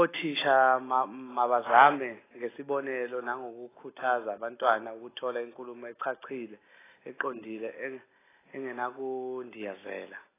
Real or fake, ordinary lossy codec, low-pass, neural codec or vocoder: real; AAC, 16 kbps; 3.6 kHz; none